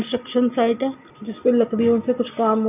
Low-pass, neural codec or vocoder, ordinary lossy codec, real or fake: 3.6 kHz; none; none; real